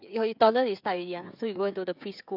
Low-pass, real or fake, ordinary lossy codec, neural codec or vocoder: 5.4 kHz; fake; none; codec, 16 kHz, 16 kbps, FreqCodec, smaller model